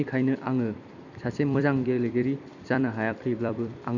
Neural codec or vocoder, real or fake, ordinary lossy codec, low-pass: vocoder, 44.1 kHz, 128 mel bands every 256 samples, BigVGAN v2; fake; none; 7.2 kHz